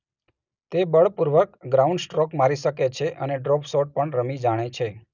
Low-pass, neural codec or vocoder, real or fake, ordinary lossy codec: 7.2 kHz; none; real; none